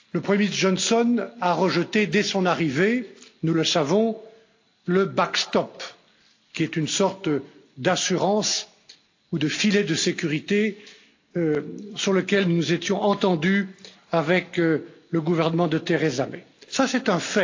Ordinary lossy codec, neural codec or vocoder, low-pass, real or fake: AAC, 48 kbps; none; 7.2 kHz; real